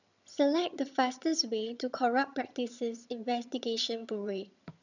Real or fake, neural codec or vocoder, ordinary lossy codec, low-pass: fake; vocoder, 22.05 kHz, 80 mel bands, HiFi-GAN; none; 7.2 kHz